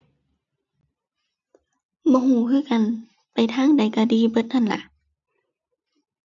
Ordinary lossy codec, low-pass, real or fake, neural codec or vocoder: none; 7.2 kHz; real; none